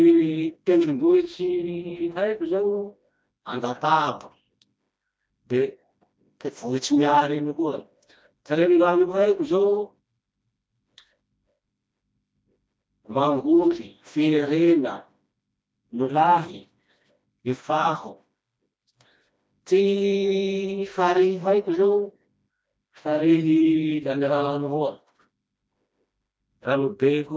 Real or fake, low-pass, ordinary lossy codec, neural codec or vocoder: fake; none; none; codec, 16 kHz, 1 kbps, FreqCodec, smaller model